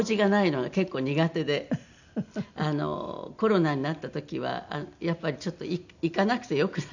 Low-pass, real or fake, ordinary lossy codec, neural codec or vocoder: 7.2 kHz; real; none; none